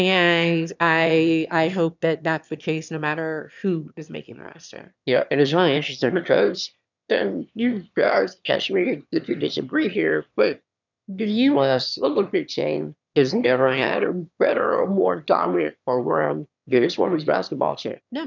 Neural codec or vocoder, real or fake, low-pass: autoencoder, 22.05 kHz, a latent of 192 numbers a frame, VITS, trained on one speaker; fake; 7.2 kHz